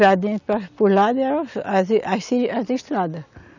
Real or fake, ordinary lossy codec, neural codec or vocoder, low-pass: real; none; none; 7.2 kHz